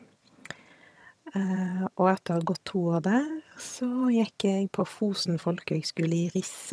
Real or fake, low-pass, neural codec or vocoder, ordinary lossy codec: fake; none; vocoder, 22.05 kHz, 80 mel bands, HiFi-GAN; none